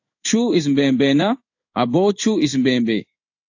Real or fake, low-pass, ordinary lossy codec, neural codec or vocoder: fake; 7.2 kHz; AAC, 48 kbps; codec, 16 kHz in and 24 kHz out, 1 kbps, XY-Tokenizer